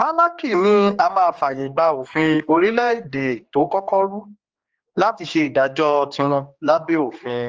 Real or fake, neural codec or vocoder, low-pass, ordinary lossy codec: fake; codec, 16 kHz, 2 kbps, X-Codec, HuBERT features, trained on general audio; 7.2 kHz; Opus, 24 kbps